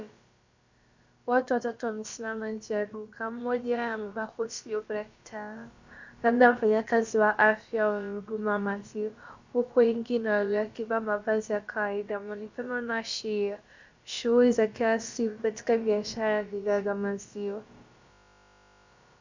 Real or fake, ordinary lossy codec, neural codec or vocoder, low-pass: fake; Opus, 64 kbps; codec, 16 kHz, about 1 kbps, DyCAST, with the encoder's durations; 7.2 kHz